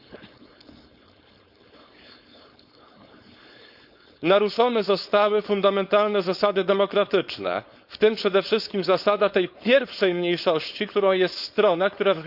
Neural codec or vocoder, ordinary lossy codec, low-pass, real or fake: codec, 16 kHz, 4.8 kbps, FACodec; Opus, 64 kbps; 5.4 kHz; fake